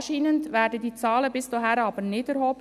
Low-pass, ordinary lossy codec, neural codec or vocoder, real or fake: 14.4 kHz; none; none; real